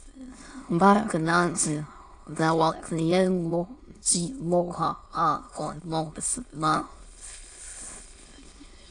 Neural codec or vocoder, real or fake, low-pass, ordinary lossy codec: autoencoder, 22.05 kHz, a latent of 192 numbers a frame, VITS, trained on many speakers; fake; 9.9 kHz; AAC, 48 kbps